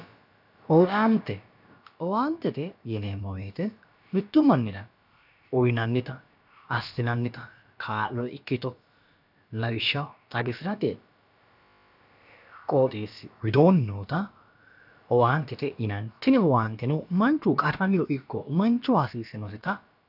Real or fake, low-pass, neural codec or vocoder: fake; 5.4 kHz; codec, 16 kHz, about 1 kbps, DyCAST, with the encoder's durations